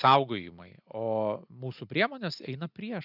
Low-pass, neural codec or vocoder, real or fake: 5.4 kHz; none; real